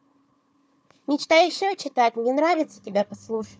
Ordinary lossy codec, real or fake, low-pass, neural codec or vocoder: none; fake; none; codec, 16 kHz, 4 kbps, FunCodec, trained on Chinese and English, 50 frames a second